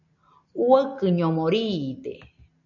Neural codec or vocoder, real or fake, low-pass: none; real; 7.2 kHz